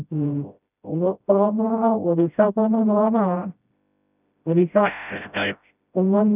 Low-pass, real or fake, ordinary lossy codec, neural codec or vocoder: 3.6 kHz; fake; none; codec, 16 kHz, 0.5 kbps, FreqCodec, smaller model